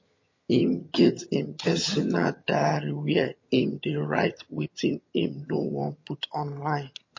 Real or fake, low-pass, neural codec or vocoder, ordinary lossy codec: fake; 7.2 kHz; vocoder, 22.05 kHz, 80 mel bands, HiFi-GAN; MP3, 32 kbps